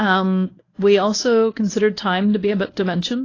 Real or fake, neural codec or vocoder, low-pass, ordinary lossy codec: fake; codec, 24 kHz, 0.9 kbps, WavTokenizer, medium speech release version 1; 7.2 kHz; AAC, 32 kbps